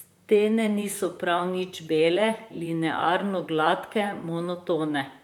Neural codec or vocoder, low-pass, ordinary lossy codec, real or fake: vocoder, 44.1 kHz, 128 mel bands, Pupu-Vocoder; 19.8 kHz; none; fake